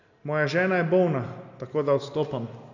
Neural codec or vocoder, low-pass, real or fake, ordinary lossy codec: none; 7.2 kHz; real; none